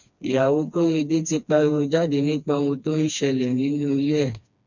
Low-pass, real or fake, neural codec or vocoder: 7.2 kHz; fake; codec, 16 kHz, 2 kbps, FreqCodec, smaller model